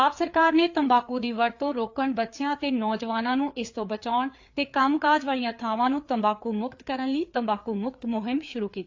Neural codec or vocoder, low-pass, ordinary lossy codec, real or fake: codec, 16 kHz, 4 kbps, FreqCodec, larger model; 7.2 kHz; AAC, 48 kbps; fake